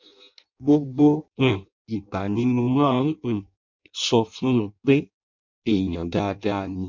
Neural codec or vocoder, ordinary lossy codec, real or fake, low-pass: codec, 16 kHz in and 24 kHz out, 0.6 kbps, FireRedTTS-2 codec; MP3, 64 kbps; fake; 7.2 kHz